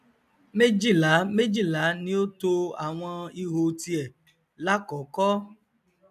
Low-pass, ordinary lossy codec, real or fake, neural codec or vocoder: 14.4 kHz; none; real; none